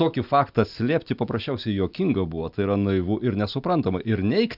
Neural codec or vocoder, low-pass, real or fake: none; 5.4 kHz; real